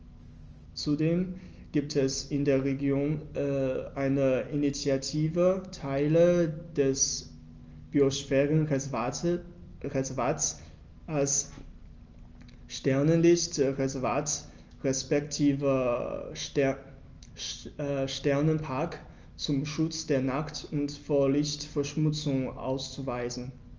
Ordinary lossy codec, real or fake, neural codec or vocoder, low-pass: Opus, 24 kbps; real; none; 7.2 kHz